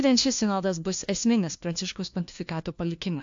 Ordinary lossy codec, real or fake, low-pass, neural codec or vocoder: AAC, 64 kbps; fake; 7.2 kHz; codec, 16 kHz, 1 kbps, FunCodec, trained on LibriTTS, 50 frames a second